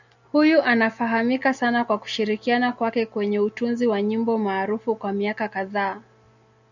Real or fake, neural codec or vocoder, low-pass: real; none; 7.2 kHz